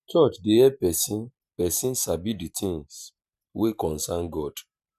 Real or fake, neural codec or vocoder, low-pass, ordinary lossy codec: fake; vocoder, 44.1 kHz, 128 mel bands every 512 samples, BigVGAN v2; 14.4 kHz; none